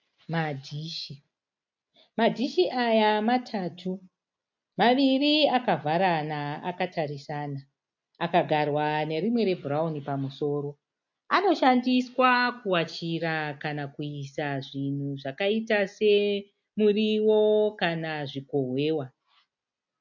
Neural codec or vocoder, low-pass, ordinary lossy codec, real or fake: none; 7.2 kHz; MP3, 64 kbps; real